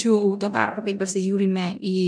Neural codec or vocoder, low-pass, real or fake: codec, 16 kHz in and 24 kHz out, 0.9 kbps, LongCat-Audio-Codec, four codebook decoder; 9.9 kHz; fake